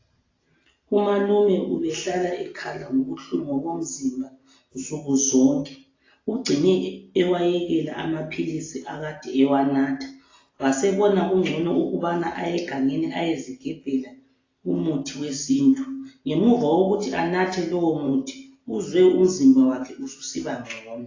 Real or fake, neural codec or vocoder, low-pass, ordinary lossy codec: real; none; 7.2 kHz; AAC, 32 kbps